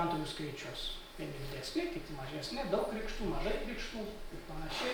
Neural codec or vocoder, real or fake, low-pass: none; real; 19.8 kHz